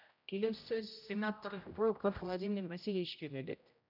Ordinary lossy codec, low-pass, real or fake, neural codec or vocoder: none; 5.4 kHz; fake; codec, 16 kHz, 0.5 kbps, X-Codec, HuBERT features, trained on general audio